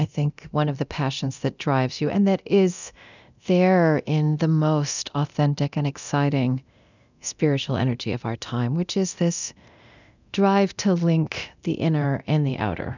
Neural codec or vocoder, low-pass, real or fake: codec, 24 kHz, 0.9 kbps, DualCodec; 7.2 kHz; fake